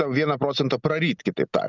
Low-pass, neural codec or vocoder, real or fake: 7.2 kHz; none; real